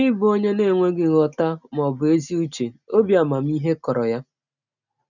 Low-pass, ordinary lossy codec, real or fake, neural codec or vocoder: 7.2 kHz; none; real; none